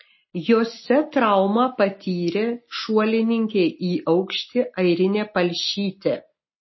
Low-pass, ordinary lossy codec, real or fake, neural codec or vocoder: 7.2 kHz; MP3, 24 kbps; real; none